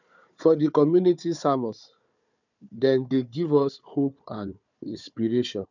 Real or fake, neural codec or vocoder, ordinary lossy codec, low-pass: fake; codec, 16 kHz, 4 kbps, FunCodec, trained on Chinese and English, 50 frames a second; none; 7.2 kHz